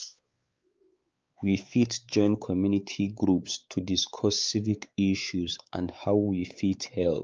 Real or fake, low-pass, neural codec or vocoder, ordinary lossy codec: fake; 7.2 kHz; codec, 16 kHz, 4 kbps, X-Codec, WavLM features, trained on Multilingual LibriSpeech; Opus, 24 kbps